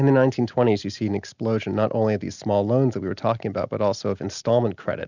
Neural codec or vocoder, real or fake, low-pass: none; real; 7.2 kHz